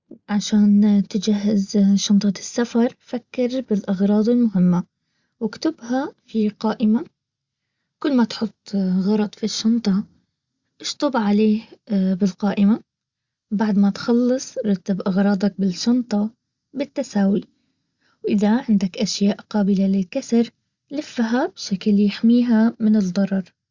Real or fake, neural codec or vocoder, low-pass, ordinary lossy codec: real; none; 7.2 kHz; Opus, 64 kbps